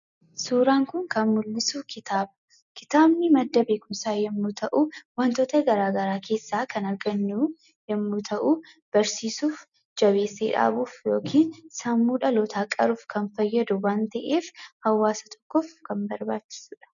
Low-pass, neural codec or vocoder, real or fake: 7.2 kHz; none; real